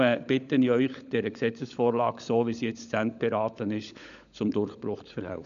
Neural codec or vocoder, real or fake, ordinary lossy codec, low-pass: codec, 16 kHz, 16 kbps, FunCodec, trained on LibriTTS, 50 frames a second; fake; none; 7.2 kHz